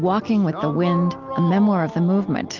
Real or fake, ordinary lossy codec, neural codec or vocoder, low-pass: real; Opus, 32 kbps; none; 7.2 kHz